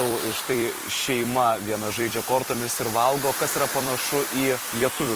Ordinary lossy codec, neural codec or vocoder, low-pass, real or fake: Opus, 24 kbps; vocoder, 44.1 kHz, 128 mel bands every 256 samples, BigVGAN v2; 14.4 kHz; fake